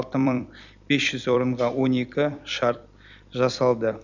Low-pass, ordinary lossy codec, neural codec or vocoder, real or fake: 7.2 kHz; none; vocoder, 44.1 kHz, 128 mel bands every 256 samples, BigVGAN v2; fake